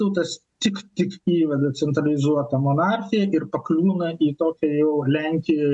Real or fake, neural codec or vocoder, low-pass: real; none; 10.8 kHz